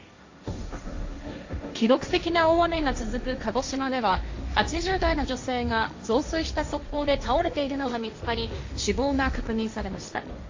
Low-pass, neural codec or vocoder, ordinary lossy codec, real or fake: 7.2 kHz; codec, 16 kHz, 1.1 kbps, Voila-Tokenizer; AAC, 48 kbps; fake